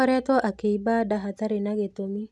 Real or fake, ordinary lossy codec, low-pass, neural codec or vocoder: real; none; none; none